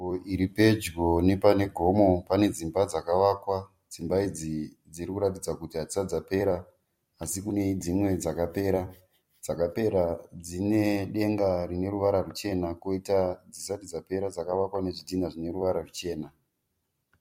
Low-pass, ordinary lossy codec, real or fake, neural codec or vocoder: 19.8 kHz; MP3, 64 kbps; fake; vocoder, 44.1 kHz, 128 mel bands every 256 samples, BigVGAN v2